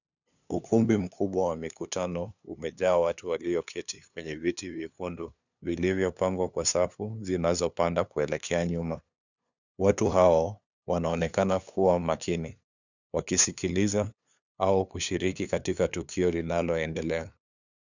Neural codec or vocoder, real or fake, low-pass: codec, 16 kHz, 2 kbps, FunCodec, trained on LibriTTS, 25 frames a second; fake; 7.2 kHz